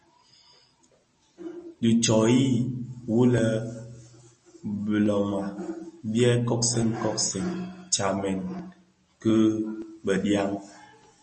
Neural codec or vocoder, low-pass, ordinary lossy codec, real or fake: none; 10.8 kHz; MP3, 32 kbps; real